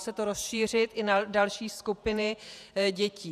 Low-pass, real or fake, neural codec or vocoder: 14.4 kHz; fake; vocoder, 48 kHz, 128 mel bands, Vocos